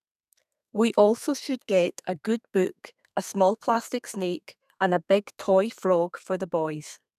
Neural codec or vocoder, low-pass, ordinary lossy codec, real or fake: codec, 32 kHz, 1.9 kbps, SNAC; 14.4 kHz; none; fake